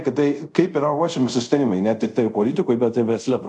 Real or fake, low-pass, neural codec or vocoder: fake; 10.8 kHz; codec, 24 kHz, 0.5 kbps, DualCodec